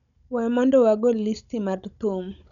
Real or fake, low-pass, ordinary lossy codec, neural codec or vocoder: fake; 7.2 kHz; none; codec, 16 kHz, 16 kbps, FunCodec, trained on Chinese and English, 50 frames a second